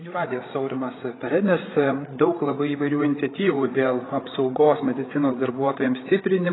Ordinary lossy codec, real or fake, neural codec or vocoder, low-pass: AAC, 16 kbps; fake; codec, 16 kHz, 8 kbps, FreqCodec, larger model; 7.2 kHz